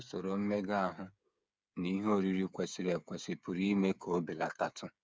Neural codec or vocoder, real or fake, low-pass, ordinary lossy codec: codec, 16 kHz, 8 kbps, FreqCodec, smaller model; fake; none; none